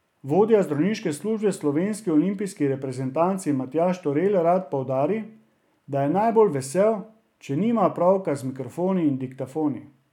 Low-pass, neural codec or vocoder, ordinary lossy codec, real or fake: 19.8 kHz; none; none; real